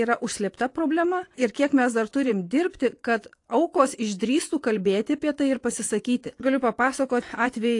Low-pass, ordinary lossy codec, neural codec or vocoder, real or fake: 10.8 kHz; AAC, 48 kbps; vocoder, 24 kHz, 100 mel bands, Vocos; fake